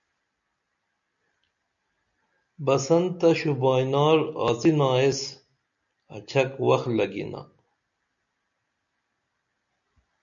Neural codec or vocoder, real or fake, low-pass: none; real; 7.2 kHz